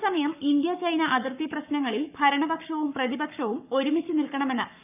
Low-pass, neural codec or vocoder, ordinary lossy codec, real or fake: 3.6 kHz; codec, 44.1 kHz, 7.8 kbps, Pupu-Codec; none; fake